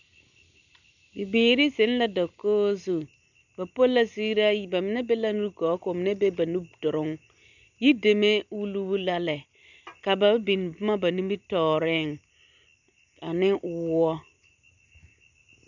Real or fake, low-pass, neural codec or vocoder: real; 7.2 kHz; none